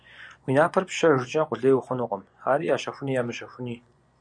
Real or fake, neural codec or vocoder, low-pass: fake; vocoder, 24 kHz, 100 mel bands, Vocos; 9.9 kHz